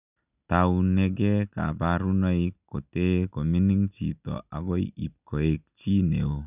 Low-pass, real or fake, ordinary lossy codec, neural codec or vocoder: 3.6 kHz; real; none; none